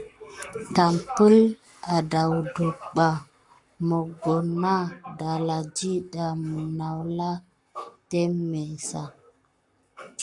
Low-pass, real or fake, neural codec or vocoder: 10.8 kHz; fake; codec, 44.1 kHz, 7.8 kbps, Pupu-Codec